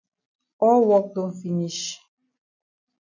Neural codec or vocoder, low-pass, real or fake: none; 7.2 kHz; real